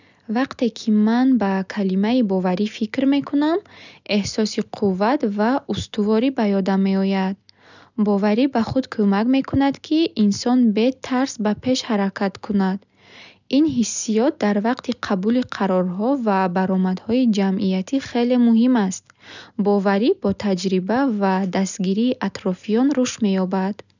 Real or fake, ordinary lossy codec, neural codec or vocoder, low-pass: real; none; none; 7.2 kHz